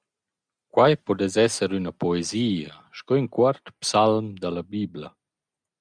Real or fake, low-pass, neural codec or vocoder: real; 9.9 kHz; none